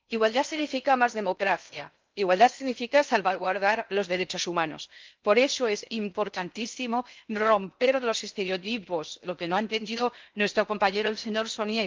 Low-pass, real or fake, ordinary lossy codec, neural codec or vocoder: 7.2 kHz; fake; Opus, 24 kbps; codec, 16 kHz in and 24 kHz out, 0.6 kbps, FocalCodec, streaming, 4096 codes